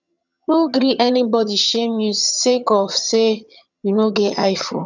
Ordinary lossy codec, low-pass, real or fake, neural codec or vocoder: none; 7.2 kHz; fake; vocoder, 22.05 kHz, 80 mel bands, HiFi-GAN